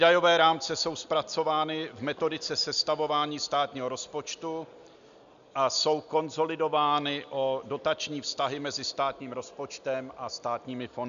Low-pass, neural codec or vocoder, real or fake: 7.2 kHz; none; real